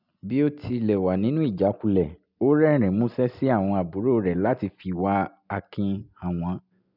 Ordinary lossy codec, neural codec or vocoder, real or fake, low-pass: none; none; real; 5.4 kHz